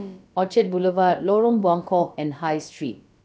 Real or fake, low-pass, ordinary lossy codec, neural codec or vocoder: fake; none; none; codec, 16 kHz, about 1 kbps, DyCAST, with the encoder's durations